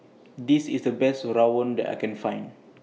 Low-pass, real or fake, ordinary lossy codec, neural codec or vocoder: none; real; none; none